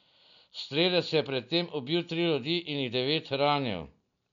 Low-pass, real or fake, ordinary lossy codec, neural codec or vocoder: 7.2 kHz; real; none; none